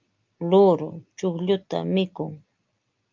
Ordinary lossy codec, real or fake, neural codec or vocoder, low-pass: Opus, 24 kbps; real; none; 7.2 kHz